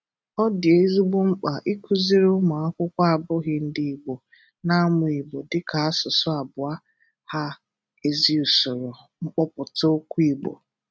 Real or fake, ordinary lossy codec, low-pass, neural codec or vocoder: real; none; none; none